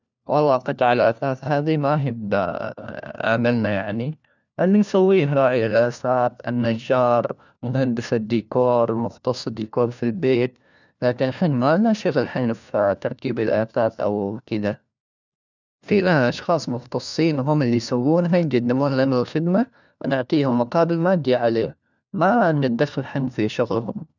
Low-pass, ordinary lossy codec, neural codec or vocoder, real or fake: 7.2 kHz; none; codec, 16 kHz, 1 kbps, FunCodec, trained on LibriTTS, 50 frames a second; fake